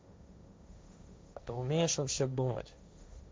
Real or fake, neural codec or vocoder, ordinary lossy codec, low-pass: fake; codec, 16 kHz, 1.1 kbps, Voila-Tokenizer; none; none